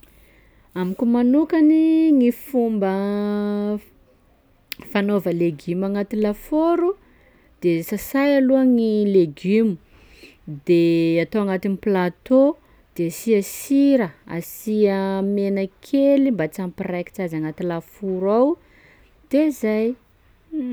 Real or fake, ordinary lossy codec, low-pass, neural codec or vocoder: real; none; none; none